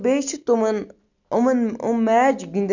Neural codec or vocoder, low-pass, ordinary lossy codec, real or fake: none; 7.2 kHz; none; real